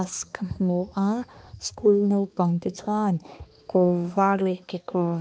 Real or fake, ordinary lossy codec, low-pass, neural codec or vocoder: fake; none; none; codec, 16 kHz, 2 kbps, X-Codec, HuBERT features, trained on balanced general audio